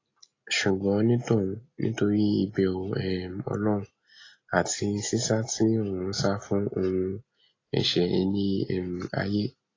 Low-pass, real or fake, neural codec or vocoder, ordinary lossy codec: 7.2 kHz; real; none; AAC, 32 kbps